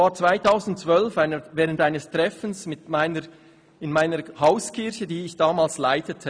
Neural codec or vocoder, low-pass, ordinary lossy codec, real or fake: none; 9.9 kHz; none; real